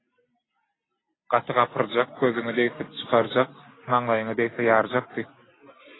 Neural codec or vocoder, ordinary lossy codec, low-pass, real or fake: none; AAC, 16 kbps; 7.2 kHz; real